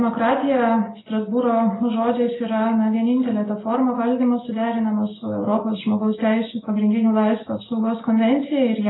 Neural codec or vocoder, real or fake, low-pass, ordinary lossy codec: none; real; 7.2 kHz; AAC, 16 kbps